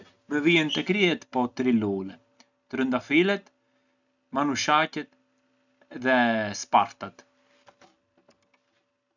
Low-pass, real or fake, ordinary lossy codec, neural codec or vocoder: 7.2 kHz; real; none; none